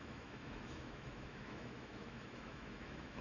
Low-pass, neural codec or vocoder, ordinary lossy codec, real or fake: 7.2 kHz; autoencoder, 48 kHz, 128 numbers a frame, DAC-VAE, trained on Japanese speech; none; fake